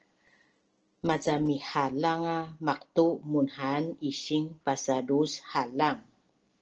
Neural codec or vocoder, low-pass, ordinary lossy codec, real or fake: none; 7.2 kHz; Opus, 16 kbps; real